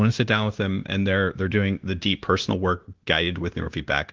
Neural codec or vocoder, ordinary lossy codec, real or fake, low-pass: codec, 16 kHz, 0.9 kbps, LongCat-Audio-Codec; Opus, 16 kbps; fake; 7.2 kHz